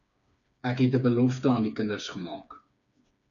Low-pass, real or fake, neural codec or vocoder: 7.2 kHz; fake; codec, 16 kHz, 4 kbps, FreqCodec, smaller model